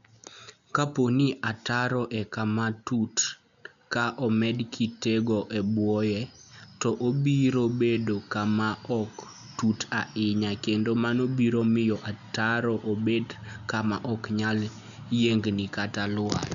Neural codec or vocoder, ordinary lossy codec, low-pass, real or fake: none; none; 7.2 kHz; real